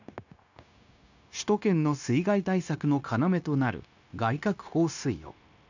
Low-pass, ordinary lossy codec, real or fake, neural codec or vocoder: 7.2 kHz; none; fake; codec, 16 kHz, 0.9 kbps, LongCat-Audio-Codec